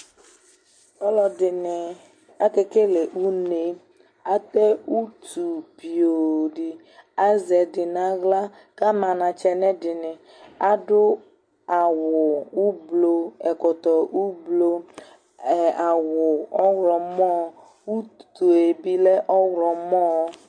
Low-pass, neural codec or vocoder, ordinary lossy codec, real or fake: 9.9 kHz; none; MP3, 48 kbps; real